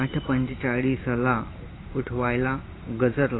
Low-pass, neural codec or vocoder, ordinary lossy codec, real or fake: 7.2 kHz; vocoder, 44.1 kHz, 128 mel bands every 512 samples, BigVGAN v2; AAC, 16 kbps; fake